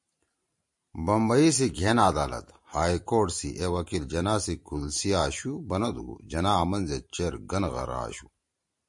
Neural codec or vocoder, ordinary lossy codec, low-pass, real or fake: vocoder, 44.1 kHz, 128 mel bands every 512 samples, BigVGAN v2; MP3, 48 kbps; 10.8 kHz; fake